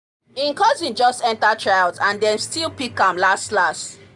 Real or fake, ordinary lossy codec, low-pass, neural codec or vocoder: real; none; 10.8 kHz; none